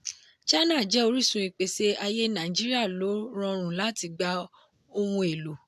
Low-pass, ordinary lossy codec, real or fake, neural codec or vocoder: 14.4 kHz; AAC, 96 kbps; real; none